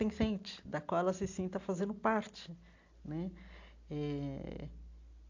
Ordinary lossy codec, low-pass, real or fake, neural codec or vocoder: AAC, 48 kbps; 7.2 kHz; real; none